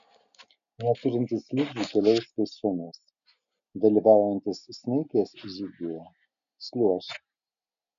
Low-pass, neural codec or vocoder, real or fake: 7.2 kHz; none; real